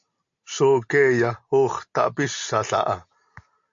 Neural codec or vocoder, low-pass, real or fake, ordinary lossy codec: none; 7.2 kHz; real; AAC, 64 kbps